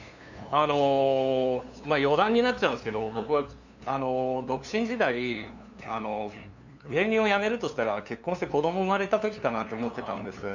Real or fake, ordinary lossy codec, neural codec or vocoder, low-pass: fake; none; codec, 16 kHz, 2 kbps, FunCodec, trained on LibriTTS, 25 frames a second; 7.2 kHz